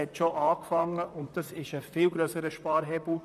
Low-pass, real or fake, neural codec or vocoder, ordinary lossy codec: 14.4 kHz; fake; vocoder, 44.1 kHz, 128 mel bands, Pupu-Vocoder; MP3, 96 kbps